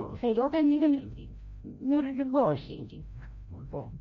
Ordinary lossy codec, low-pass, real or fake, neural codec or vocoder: MP3, 48 kbps; 7.2 kHz; fake; codec, 16 kHz, 0.5 kbps, FreqCodec, larger model